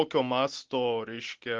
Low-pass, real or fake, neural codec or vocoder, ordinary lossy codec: 7.2 kHz; real; none; Opus, 16 kbps